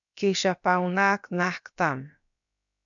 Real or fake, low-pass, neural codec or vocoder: fake; 7.2 kHz; codec, 16 kHz, about 1 kbps, DyCAST, with the encoder's durations